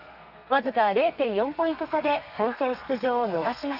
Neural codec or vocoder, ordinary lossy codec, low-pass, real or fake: codec, 32 kHz, 1.9 kbps, SNAC; none; 5.4 kHz; fake